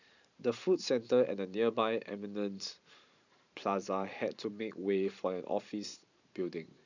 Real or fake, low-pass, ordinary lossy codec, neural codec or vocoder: fake; 7.2 kHz; none; vocoder, 44.1 kHz, 128 mel bands every 512 samples, BigVGAN v2